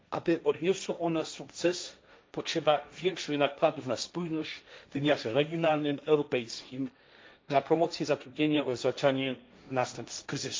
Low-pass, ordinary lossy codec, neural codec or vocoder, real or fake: none; none; codec, 16 kHz, 1.1 kbps, Voila-Tokenizer; fake